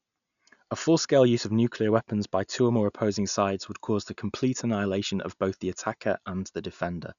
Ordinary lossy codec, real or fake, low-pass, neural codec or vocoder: none; real; 7.2 kHz; none